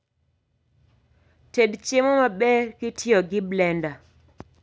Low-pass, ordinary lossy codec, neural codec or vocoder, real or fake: none; none; none; real